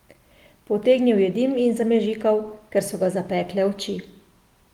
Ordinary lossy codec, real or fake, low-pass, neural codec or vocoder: Opus, 24 kbps; real; 19.8 kHz; none